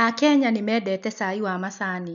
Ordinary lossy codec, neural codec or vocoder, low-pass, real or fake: none; none; 7.2 kHz; real